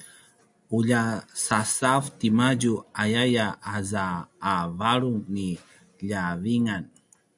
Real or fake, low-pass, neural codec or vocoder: real; 10.8 kHz; none